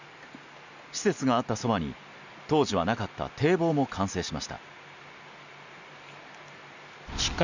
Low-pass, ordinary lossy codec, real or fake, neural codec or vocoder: 7.2 kHz; none; real; none